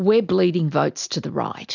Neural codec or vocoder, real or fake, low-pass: none; real; 7.2 kHz